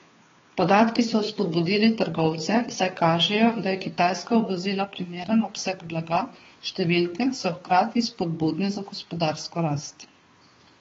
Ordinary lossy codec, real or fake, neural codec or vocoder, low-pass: AAC, 24 kbps; fake; codec, 16 kHz, 2 kbps, FunCodec, trained on Chinese and English, 25 frames a second; 7.2 kHz